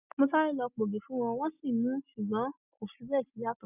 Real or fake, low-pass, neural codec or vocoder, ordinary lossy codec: real; 3.6 kHz; none; none